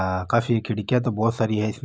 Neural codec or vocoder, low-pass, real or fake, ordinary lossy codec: none; none; real; none